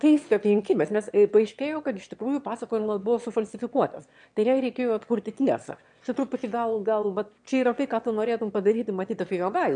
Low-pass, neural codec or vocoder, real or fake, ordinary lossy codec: 9.9 kHz; autoencoder, 22.05 kHz, a latent of 192 numbers a frame, VITS, trained on one speaker; fake; MP3, 64 kbps